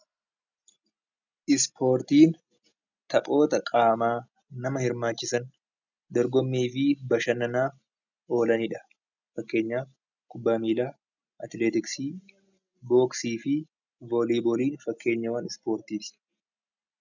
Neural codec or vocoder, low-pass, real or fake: none; 7.2 kHz; real